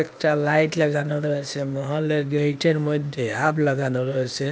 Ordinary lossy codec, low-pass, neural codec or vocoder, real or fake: none; none; codec, 16 kHz, 0.8 kbps, ZipCodec; fake